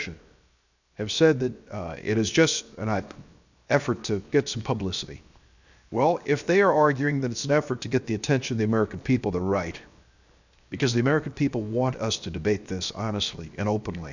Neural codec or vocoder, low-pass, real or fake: codec, 16 kHz, 0.7 kbps, FocalCodec; 7.2 kHz; fake